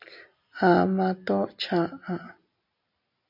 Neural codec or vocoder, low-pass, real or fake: none; 5.4 kHz; real